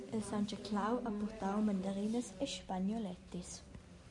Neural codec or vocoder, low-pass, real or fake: none; 10.8 kHz; real